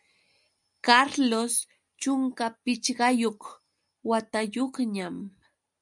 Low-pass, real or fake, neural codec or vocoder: 10.8 kHz; real; none